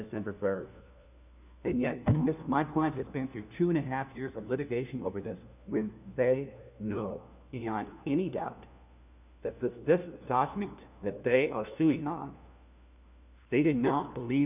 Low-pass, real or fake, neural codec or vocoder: 3.6 kHz; fake; codec, 16 kHz, 1 kbps, FunCodec, trained on LibriTTS, 50 frames a second